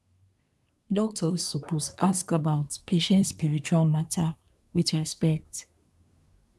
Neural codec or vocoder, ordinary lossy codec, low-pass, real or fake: codec, 24 kHz, 1 kbps, SNAC; none; none; fake